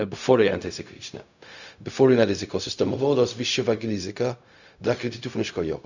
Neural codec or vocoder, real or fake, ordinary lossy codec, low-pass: codec, 16 kHz, 0.4 kbps, LongCat-Audio-Codec; fake; none; 7.2 kHz